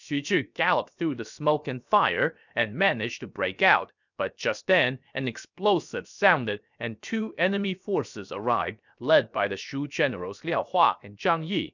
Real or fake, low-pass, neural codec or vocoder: fake; 7.2 kHz; codec, 16 kHz, 0.7 kbps, FocalCodec